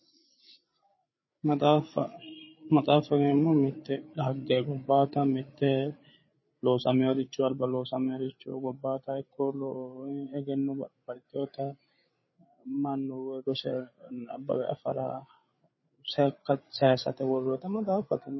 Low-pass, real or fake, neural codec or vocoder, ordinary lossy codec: 7.2 kHz; fake; codec, 16 kHz, 8 kbps, FreqCodec, larger model; MP3, 24 kbps